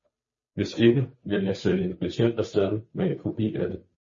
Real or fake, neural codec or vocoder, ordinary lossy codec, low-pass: fake; codec, 16 kHz, 2 kbps, FunCodec, trained on Chinese and English, 25 frames a second; MP3, 32 kbps; 7.2 kHz